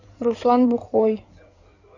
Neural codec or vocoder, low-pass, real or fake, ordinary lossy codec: codec, 16 kHz in and 24 kHz out, 2.2 kbps, FireRedTTS-2 codec; 7.2 kHz; fake; none